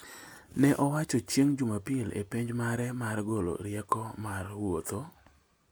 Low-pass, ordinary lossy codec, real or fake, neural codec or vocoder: none; none; real; none